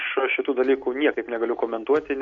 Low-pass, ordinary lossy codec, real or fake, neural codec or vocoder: 7.2 kHz; AAC, 48 kbps; real; none